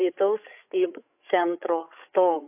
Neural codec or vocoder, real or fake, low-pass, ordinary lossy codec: codec, 16 kHz, 8 kbps, FreqCodec, larger model; fake; 3.6 kHz; AAC, 32 kbps